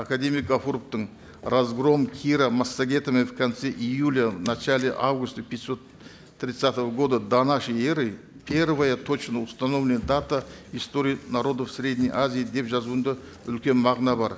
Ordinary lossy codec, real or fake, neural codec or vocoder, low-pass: none; real; none; none